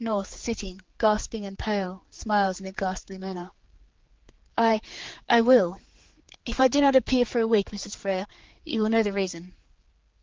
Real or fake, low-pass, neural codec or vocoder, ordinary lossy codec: fake; 7.2 kHz; codec, 16 kHz, 4 kbps, X-Codec, HuBERT features, trained on general audio; Opus, 16 kbps